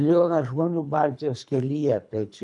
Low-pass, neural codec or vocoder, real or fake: 10.8 kHz; codec, 24 kHz, 3 kbps, HILCodec; fake